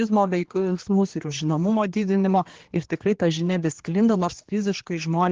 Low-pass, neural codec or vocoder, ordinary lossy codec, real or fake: 7.2 kHz; codec, 16 kHz, 2 kbps, X-Codec, HuBERT features, trained on general audio; Opus, 16 kbps; fake